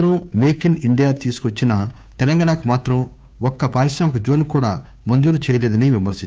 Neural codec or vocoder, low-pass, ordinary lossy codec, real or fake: codec, 16 kHz, 2 kbps, FunCodec, trained on Chinese and English, 25 frames a second; none; none; fake